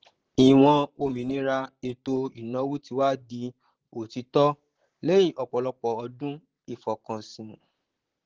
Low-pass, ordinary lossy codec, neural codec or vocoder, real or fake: 7.2 kHz; Opus, 16 kbps; vocoder, 44.1 kHz, 128 mel bands, Pupu-Vocoder; fake